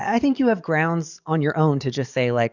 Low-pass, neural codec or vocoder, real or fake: 7.2 kHz; codec, 44.1 kHz, 7.8 kbps, DAC; fake